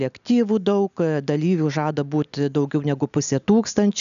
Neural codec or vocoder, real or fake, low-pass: none; real; 7.2 kHz